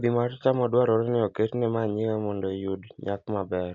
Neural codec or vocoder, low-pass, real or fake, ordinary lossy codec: none; 7.2 kHz; real; none